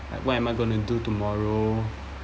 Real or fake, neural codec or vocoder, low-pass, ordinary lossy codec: real; none; none; none